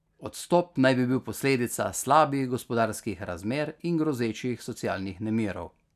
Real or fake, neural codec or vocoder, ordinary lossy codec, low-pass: real; none; none; 14.4 kHz